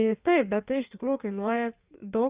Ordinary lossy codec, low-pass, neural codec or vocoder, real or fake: Opus, 64 kbps; 3.6 kHz; codec, 16 kHz in and 24 kHz out, 1.1 kbps, FireRedTTS-2 codec; fake